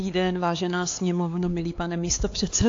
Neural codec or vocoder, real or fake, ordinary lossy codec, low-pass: codec, 16 kHz, 4 kbps, X-Codec, HuBERT features, trained on LibriSpeech; fake; AAC, 48 kbps; 7.2 kHz